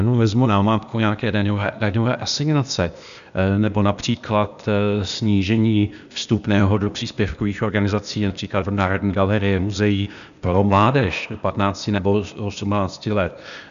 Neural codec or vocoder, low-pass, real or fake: codec, 16 kHz, 0.8 kbps, ZipCodec; 7.2 kHz; fake